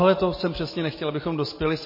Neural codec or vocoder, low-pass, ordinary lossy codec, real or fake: none; 5.4 kHz; MP3, 24 kbps; real